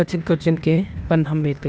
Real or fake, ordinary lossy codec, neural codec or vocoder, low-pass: fake; none; codec, 16 kHz, 0.8 kbps, ZipCodec; none